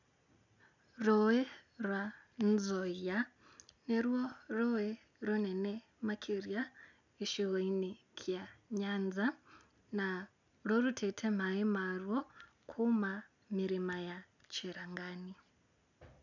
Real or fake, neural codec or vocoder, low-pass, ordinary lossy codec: real; none; 7.2 kHz; none